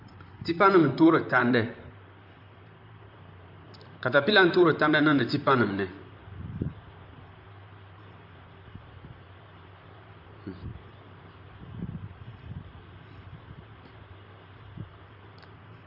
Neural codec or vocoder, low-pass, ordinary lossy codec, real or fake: vocoder, 44.1 kHz, 128 mel bands, Pupu-Vocoder; 5.4 kHz; MP3, 48 kbps; fake